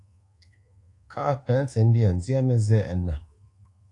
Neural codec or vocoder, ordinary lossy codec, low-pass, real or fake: codec, 24 kHz, 1.2 kbps, DualCodec; AAC, 48 kbps; 10.8 kHz; fake